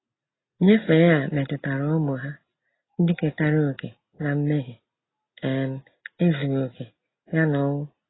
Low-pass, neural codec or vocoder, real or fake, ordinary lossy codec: 7.2 kHz; none; real; AAC, 16 kbps